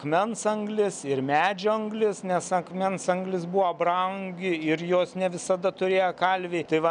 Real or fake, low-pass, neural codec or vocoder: real; 9.9 kHz; none